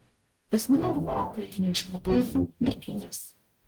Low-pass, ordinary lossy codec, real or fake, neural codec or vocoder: 19.8 kHz; Opus, 24 kbps; fake; codec, 44.1 kHz, 0.9 kbps, DAC